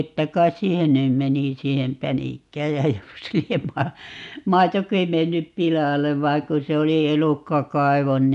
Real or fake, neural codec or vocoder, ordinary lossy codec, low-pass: real; none; none; 10.8 kHz